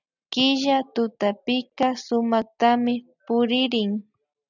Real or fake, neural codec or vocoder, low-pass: real; none; 7.2 kHz